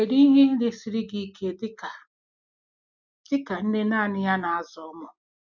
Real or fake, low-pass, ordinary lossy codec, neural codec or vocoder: real; 7.2 kHz; none; none